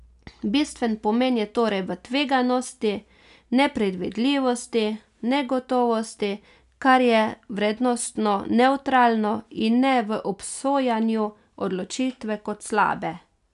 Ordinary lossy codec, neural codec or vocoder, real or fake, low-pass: none; none; real; 10.8 kHz